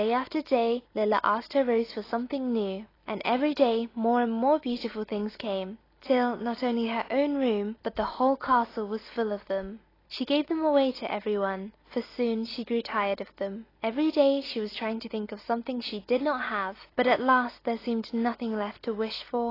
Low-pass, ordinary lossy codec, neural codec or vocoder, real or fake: 5.4 kHz; AAC, 24 kbps; none; real